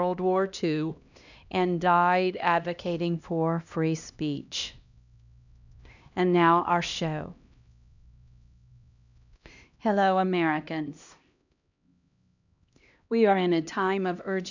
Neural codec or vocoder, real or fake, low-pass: codec, 16 kHz, 1 kbps, X-Codec, HuBERT features, trained on LibriSpeech; fake; 7.2 kHz